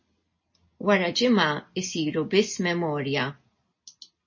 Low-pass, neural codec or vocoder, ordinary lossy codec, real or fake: 7.2 kHz; none; MP3, 32 kbps; real